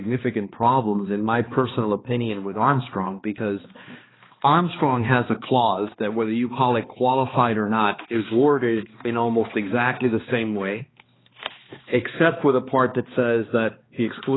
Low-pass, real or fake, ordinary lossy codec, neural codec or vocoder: 7.2 kHz; fake; AAC, 16 kbps; codec, 16 kHz, 2 kbps, X-Codec, HuBERT features, trained on balanced general audio